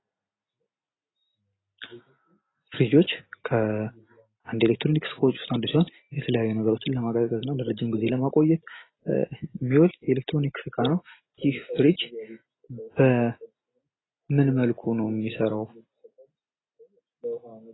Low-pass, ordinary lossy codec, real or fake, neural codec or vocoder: 7.2 kHz; AAC, 16 kbps; real; none